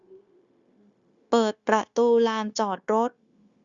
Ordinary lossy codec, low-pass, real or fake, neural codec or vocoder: Opus, 64 kbps; 7.2 kHz; fake; codec, 16 kHz, 0.9 kbps, LongCat-Audio-Codec